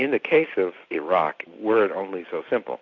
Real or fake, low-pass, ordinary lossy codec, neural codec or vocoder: real; 7.2 kHz; AAC, 48 kbps; none